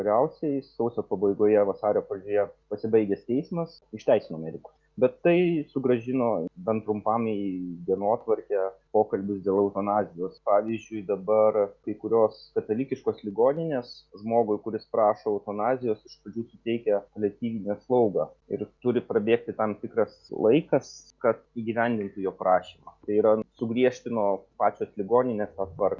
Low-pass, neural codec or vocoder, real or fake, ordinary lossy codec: 7.2 kHz; none; real; Opus, 64 kbps